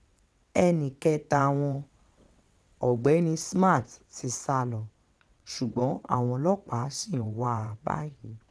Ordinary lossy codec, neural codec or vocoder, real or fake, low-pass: none; vocoder, 22.05 kHz, 80 mel bands, WaveNeXt; fake; none